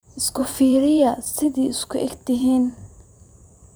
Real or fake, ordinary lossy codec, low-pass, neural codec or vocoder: fake; none; none; vocoder, 44.1 kHz, 128 mel bands every 512 samples, BigVGAN v2